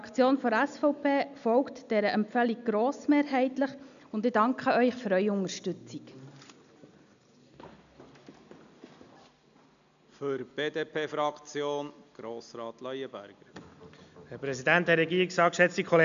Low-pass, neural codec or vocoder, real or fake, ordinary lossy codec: 7.2 kHz; none; real; none